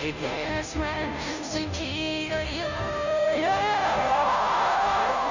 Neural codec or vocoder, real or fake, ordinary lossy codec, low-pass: codec, 16 kHz, 0.5 kbps, FunCodec, trained on Chinese and English, 25 frames a second; fake; none; 7.2 kHz